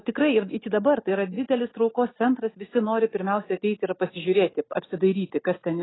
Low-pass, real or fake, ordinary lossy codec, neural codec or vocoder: 7.2 kHz; real; AAC, 16 kbps; none